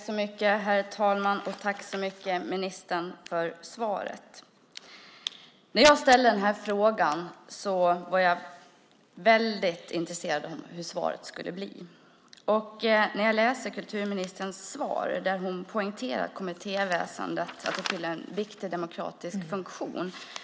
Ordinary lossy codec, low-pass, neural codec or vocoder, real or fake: none; none; none; real